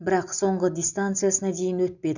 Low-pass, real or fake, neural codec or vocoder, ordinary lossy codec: 7.2 kHz; real; none; none